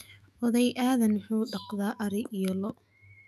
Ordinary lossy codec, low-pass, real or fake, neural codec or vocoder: none; 14.4 kHz; fake; autoencoder, 48 kHz, 128 numbers a frame, DAC-VAE, trained on Japanese speech